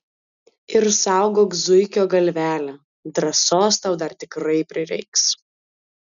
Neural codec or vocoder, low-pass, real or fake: none; 7.2 kHz; real